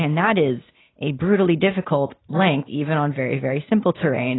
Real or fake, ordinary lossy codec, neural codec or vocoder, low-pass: real; AAC, 16 kbps; none; 7.2 kHz